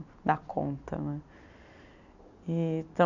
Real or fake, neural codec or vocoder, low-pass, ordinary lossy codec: real; none; 7.2 kHz; none